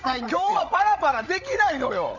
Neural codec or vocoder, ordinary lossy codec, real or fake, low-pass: codec, 16 kHz, 16 kbps, FreqCodec, larger model; none; fake; 7.2 kHz